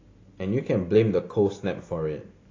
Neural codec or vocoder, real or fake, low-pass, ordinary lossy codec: none; real; 7.2 kHz; AAC, 32 kbps